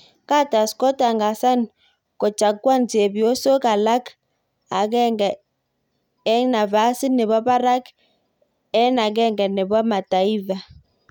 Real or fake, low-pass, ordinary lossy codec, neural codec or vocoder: real; 19.8 kHz; none; none